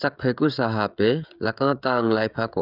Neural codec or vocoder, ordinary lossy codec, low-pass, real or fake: codec, 16 kHz, 16 kbps, FreqCodec, smaller model; none; 5.4 kHz; fake